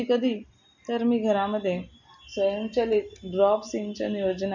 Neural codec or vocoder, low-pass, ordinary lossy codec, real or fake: none; 7.2 kHz; none; real